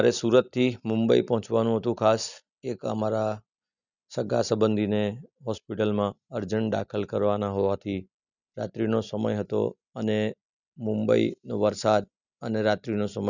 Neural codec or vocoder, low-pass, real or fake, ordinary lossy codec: none; 7.2 kHz; real; none